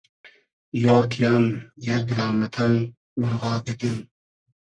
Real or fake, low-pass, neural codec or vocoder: fake; 9.9 kHz; codec, 44.1 kHz, 1.7 kbps, Pupu-Codec